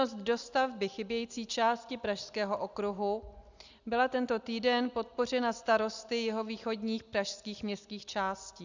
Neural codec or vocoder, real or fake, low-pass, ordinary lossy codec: none; real; 7.2 kHz; Opus, 64 kbps